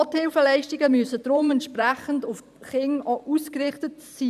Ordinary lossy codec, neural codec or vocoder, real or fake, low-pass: none; vocoder, 44.1 kHz, 128 mel bands every 512 samples, BigVGAN v2; fake; 14.4 kHz